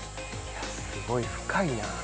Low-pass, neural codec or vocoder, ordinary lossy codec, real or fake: none; none; none; real